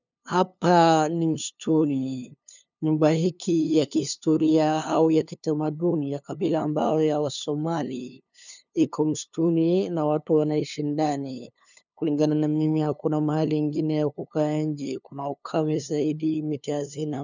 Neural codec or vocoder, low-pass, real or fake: codec, 16 kHz, 2 kbps, FunCodec, trained on LibriTTS, 25 frames a second; 7.2 kHz; fake